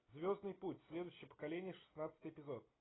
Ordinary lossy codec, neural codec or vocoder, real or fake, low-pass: AAC, 16 kbps; none; real; 7.2 kHz